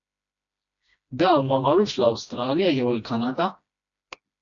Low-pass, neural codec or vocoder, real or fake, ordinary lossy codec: 7.2 kHz; codec, 16 kHz, 1 kbps, FreqCodec, smaller model; fake; AAC, 64 kbps